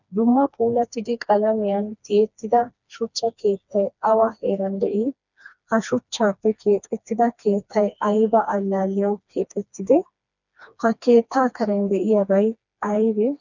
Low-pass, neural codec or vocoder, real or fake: 7.2 kHz; codec, 16 kHz, 2 kbps, FreqCodec, smaller model; fake